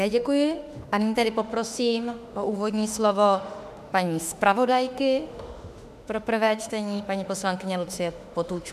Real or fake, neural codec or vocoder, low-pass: fake; autoencoder, 48 kHz, 32 numbers a frame, DAC-VAE, trained on Japanese speech; 14.4 kHz